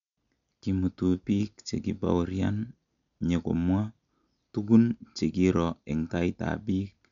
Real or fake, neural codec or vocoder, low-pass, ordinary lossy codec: real; none; 7.2 kHz; none